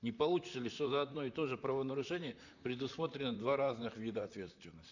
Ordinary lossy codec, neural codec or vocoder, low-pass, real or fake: none; vocoder, 44.1 kHz, 128 mel bands, Pupu-Vocoder; 7.2 kHz; fake